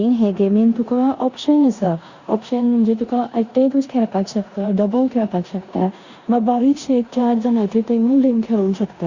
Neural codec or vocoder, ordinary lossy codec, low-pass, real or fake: codec, 16 kHz in and 24 kHz out, 0.9 kbps, LongCat-Audio-Codec, four codebook decoder; Opus, 64 kbps; 7.2 kHz; fake